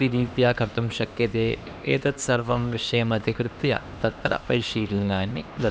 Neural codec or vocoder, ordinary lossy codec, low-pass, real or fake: codec, 16 kHz, 2 kbps, X-Codec, HuBERT features, trained on LibriSpeech; none; none; fake